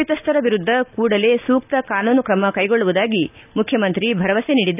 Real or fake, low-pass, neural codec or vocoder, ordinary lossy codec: real; 3.6 kHz; none; none